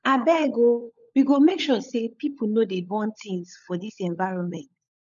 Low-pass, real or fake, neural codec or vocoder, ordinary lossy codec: 7.2 kHz; fake; codec, 16 kHz, 16 kbps, FunCodec, trained on LibriTTS, 50 frames a second; none